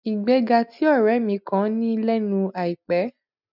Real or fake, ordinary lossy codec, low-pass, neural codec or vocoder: real; none; 5.4 kHz; none